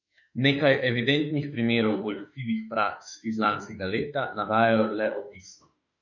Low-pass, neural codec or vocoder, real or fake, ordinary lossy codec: 7.2 kHz; autoencoder, 48 kHz, 32 numbers a frame, DAC-VAE, trained on Japanese speech; fake; none